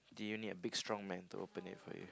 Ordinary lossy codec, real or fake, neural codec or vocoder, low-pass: none; real; none; none